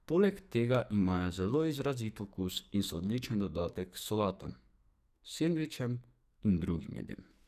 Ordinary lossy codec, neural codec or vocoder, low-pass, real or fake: none; codec, 44.1 kHz, 2.6 kbps, SNAC; 14.4 kHz; fake